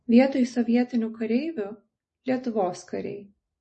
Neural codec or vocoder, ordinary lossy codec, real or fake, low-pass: none; MP3, 32 kbps; real; 10.8 kHz